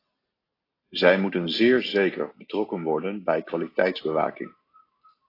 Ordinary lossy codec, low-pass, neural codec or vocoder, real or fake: AAC, 24 kbps; 5.4 kHz; none; real